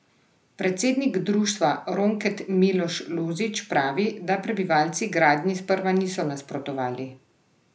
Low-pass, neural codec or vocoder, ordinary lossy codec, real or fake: none; none; none; real